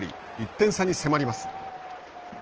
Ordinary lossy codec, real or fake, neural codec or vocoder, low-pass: Opus, 16 kbps; real; none; 7.2 kHz